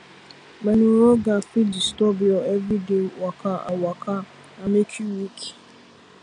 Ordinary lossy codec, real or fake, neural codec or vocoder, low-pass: none; real; none; 9.9 kHz